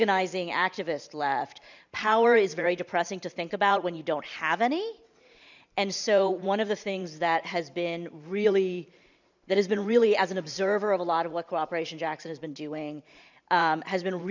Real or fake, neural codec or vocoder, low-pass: fake; vocoder, 44.1 kHz, 128 mel bands every 512 samples, BigVGAN v2; 7.2 kHz